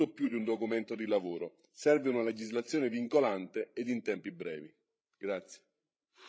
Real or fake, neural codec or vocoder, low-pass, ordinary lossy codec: fake; codec, 16 kHz, 16 kbps, FreqCodec, larger model; none; none